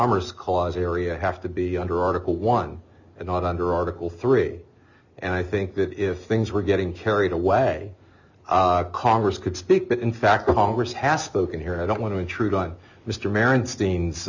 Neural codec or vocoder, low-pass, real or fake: none; 7.2 kHz; real